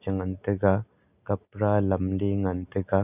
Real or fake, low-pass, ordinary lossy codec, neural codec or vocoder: real; 3.6 kHz; none; none